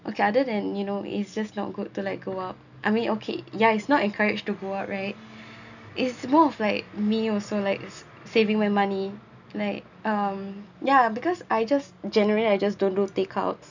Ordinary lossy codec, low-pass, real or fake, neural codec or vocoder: none; 7.2 kHz; real; none